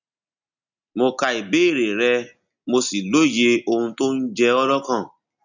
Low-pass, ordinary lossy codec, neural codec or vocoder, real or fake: 7.2 kHz; none; none; real